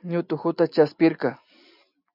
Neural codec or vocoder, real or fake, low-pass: none; real; 5.4 kHz